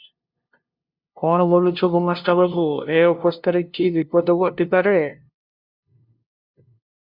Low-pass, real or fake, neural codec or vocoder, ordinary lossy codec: 5.4 kHz; fake; codec, 16 kHz, 0.5 kbps, FunCodec, trained on LibriTTS, 25 frames a second; Opus, 64 kbps